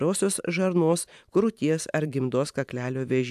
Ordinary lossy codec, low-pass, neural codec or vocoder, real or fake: AAC, 96 kbps; 14.4 kHz; vocoder, 44.1 kHz, 128 mel bands every 512 samples, BigVGAN v2; fake